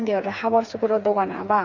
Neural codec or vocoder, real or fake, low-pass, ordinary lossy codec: codec, 16 kHz, 4 kbps, FreqCodec, smaller model; fake; 7.2 kHz; none